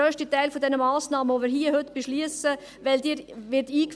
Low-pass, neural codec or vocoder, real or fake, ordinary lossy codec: none; none; real; none